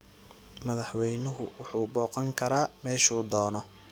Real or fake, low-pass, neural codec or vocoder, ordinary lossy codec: fake; none; codec, 44.1 kHz, 7.8 kbps, DAC; none